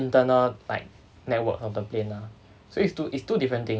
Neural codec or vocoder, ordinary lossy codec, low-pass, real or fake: none; none; none; real